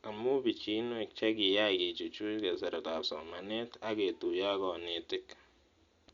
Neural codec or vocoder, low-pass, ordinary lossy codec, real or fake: none; 7.2 kHz; Opus, 64 kbps; real